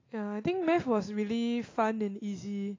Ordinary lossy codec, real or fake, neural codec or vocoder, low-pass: AAC, 32 kbps; real; none; 7.2 kHz